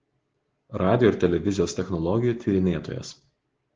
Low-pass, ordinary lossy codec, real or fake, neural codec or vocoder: 7.2 kHz; Opus, 16 kbps; real; none